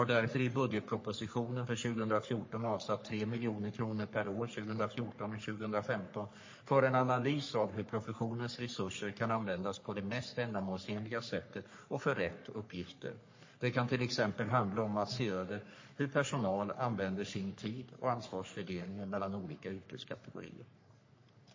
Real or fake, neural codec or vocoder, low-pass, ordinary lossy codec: fake; codec, 44.1 kHz, 3.4 kbps, Pupu-Codec; 7.2 kHz; MP3, 32 kbps